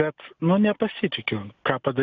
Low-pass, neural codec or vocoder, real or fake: 7.2 kHz; none; real